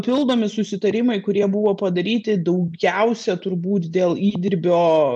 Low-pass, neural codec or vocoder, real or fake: 9.9 kHz; none; real